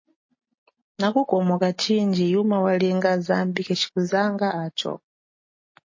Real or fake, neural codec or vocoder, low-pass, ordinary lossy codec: real; none; 7.2 kHz; MP3, 32 kbps